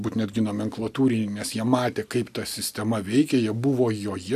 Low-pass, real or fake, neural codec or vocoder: 14.4 kHz; real; none